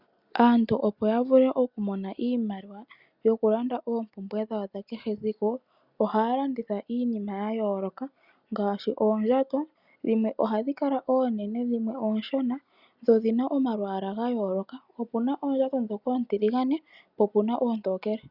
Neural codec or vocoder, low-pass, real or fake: none; 5.4 kHz; real